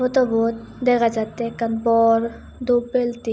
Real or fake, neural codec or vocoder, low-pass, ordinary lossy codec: real; none; none; none